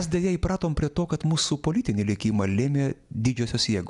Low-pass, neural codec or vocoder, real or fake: 10.8 kHz; none; real